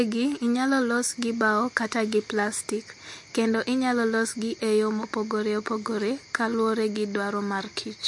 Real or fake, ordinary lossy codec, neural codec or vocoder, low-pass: real; MP3, 48 kbps; none; 10.8 kHz